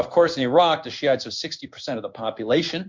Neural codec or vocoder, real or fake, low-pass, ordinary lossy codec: codec, 16 kHz in and 24 kHz out, 1 kbps, XY-Tokenizer; fake; 7.2 kHz; MP3, 64 kbps